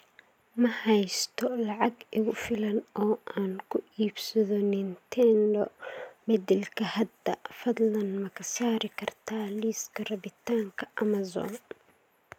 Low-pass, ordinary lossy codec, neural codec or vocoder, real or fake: 19.8 kHz; none; none; real